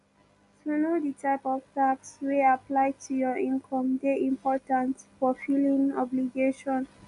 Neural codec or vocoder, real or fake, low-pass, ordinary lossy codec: none; real; 10.8 kHz; none